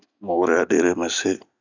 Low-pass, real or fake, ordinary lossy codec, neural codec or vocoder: 7.2 kHz; real; none; none